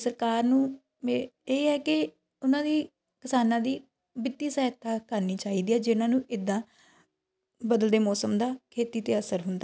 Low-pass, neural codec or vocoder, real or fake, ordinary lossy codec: none; none; real; none